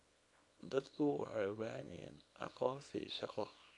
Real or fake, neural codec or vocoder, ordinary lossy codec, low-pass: fake; codec, 24 kHz, 0.9 kbps, WavTokenizer, small release; none; 10.8 kHz